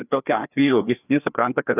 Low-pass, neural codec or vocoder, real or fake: 3.6 kHz; codec, 16 kHz, 2 kbps, FreqCodec, larger model; fake